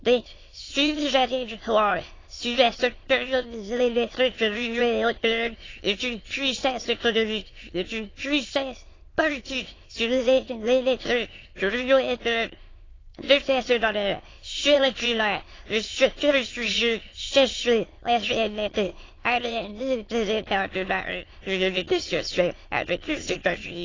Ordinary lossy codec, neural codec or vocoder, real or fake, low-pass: AAC, 32 kbps; autoencoder, 22.05 kHz, a latent of 192 numbers a frame, VITS, trained on many speakers; fake; 7.2 kHz